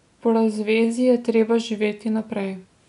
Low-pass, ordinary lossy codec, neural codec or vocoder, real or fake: 10.8 kHz; none; none; real